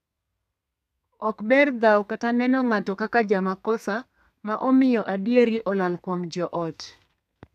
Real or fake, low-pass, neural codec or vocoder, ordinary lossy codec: fake; 14.4 kHz; codec, 32 kHz, 1.9 kbps, SNAC; none